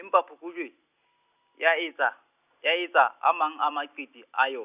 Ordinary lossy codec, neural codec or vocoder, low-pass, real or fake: none; none; 3.6 kHz; real